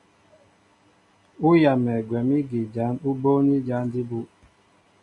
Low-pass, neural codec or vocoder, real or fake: 10.8 kHz; none; real